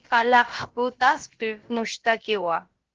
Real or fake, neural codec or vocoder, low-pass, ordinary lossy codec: fake; codec, 16 kHz, about 1 kbps, DyCAST, with the encoder's durations; 7.2 kHz; Opus, 16 kbps